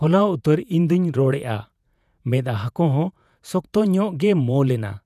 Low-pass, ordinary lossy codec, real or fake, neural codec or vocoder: 14.4 kHz; none; fake; vocoder, 48 kHz, 128 mel bands, Vocos